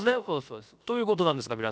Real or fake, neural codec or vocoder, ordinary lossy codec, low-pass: fake; codec, 16 kHz, about 1 kbps, DyCAST, with the encoder's durations; none; none